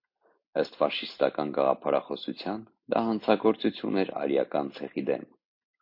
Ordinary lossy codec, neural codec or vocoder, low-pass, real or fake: MP3, 32 kbps; none; 5.4 kHz; real